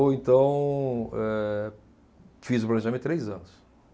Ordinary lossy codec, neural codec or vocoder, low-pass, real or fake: none; none; none; real